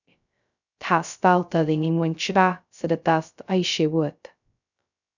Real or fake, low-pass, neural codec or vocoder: fake; 7.2 kHz; codec, 16 kHz, 0.2 kbps, FocalCodec